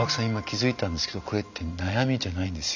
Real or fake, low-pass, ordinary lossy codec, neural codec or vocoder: real; 7.2 kHz; none; none